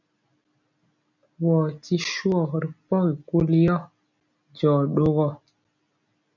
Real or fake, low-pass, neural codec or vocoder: real; 7.2 kHz; none